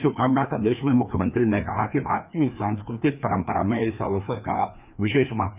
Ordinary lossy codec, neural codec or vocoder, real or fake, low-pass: none; codec, 16 kHz, 2 kbps, FreqCodec, larger model; fake; 3.6 kHz